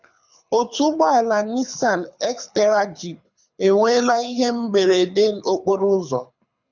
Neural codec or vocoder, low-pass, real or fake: codec, 24 kHz, 6 kbps, HILCodec; 7.2 kHz; fake